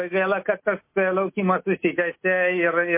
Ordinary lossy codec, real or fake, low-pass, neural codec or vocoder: MP3, 24 kbps; real; 3.6 kHz; none